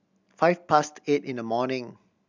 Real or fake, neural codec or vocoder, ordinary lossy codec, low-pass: real; none; none; 7.2 kHz